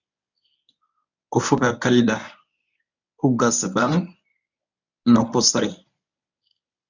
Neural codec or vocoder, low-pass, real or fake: codec, 24 kHz, 0.9 kbps, WavTokenizer, medium speech release version 1; 7.2 kHz; fake